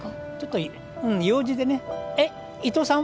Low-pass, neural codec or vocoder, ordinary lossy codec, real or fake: none; none; none; real